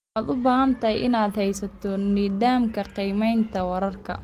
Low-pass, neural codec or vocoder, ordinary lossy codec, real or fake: 14.4 kHz; none; Opus, 24 kbps; real